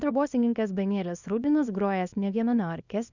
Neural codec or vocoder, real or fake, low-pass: codec, 24 kHz, 0.9 kbps, WavTokenizer, small release; fake; 7.2 kHz